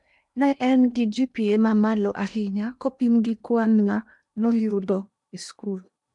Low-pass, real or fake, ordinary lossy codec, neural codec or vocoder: 10.8 kHz; fake; none; codec, 16 kHz in and 24 kHz out, 0.8 kbps, FocalCodec, streaming, 65536 codes